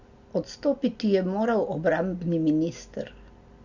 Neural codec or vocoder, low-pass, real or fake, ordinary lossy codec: none; 7.2 kHz; real; Opus, 64 kbps